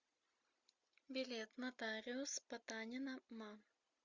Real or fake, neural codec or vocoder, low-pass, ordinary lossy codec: real; none; 7.2 kHz; Opus, 64 kbps